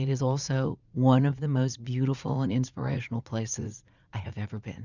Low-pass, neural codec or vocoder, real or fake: 7.2 kHz; vocoder, 44.1 kHz, 80 mel bands, Vocos; fake